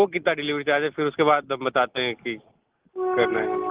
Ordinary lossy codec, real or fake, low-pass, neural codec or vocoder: Opus, 16 kbps; real; 3.6 kHz; none